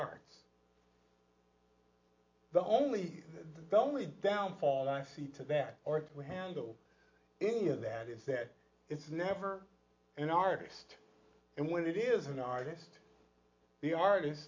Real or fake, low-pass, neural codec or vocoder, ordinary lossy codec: real; 7.2 kHz; none; MP3, 48 kbps